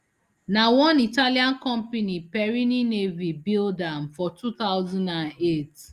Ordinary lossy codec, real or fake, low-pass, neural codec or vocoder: Opus, 32 kbps; real; 14.4 kHz; none